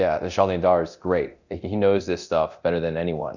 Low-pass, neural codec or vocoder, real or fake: 7.2 kHz; codec, 24 kHz, 0.9 kbps, DualCodec; fake